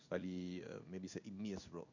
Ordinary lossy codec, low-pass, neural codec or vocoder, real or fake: none; 7.2 kHz; codec, 16 kHz in and 24 kHz out, 1 kbps, XY-Tokenizer; fake